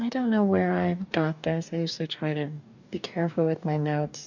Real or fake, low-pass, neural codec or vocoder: fake; 7.2 kHz; codec, 44.1 kHz, 2.6 kbps, DAC